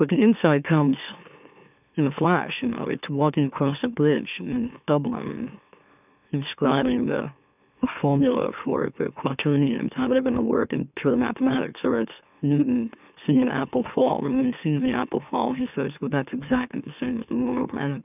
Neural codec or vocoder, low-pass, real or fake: autoencoder, 44.1 kHz, a latent of 192 numbers a frame, MeloTTS; 3.6 kHz; fake